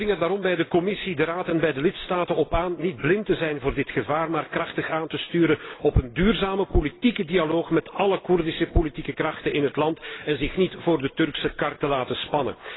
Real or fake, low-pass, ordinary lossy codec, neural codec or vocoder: real; 7.2 kHz; AAC, 16 kbps; none